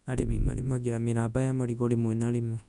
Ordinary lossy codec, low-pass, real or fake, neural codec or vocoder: none; 10.8 kHz; fake; codec, 24 kHz, 0.9 kbps, WavTokenizer, large speech release